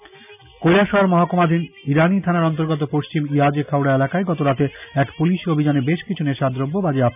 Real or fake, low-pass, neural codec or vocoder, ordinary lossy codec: real; 3.6 kHz; none; none